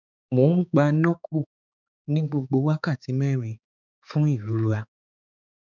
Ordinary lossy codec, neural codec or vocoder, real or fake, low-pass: none; codec, 16 kHz, 4 kbps, X-Codec, HuBERT features, trained on balanced general audio; fake; 7.2 kHz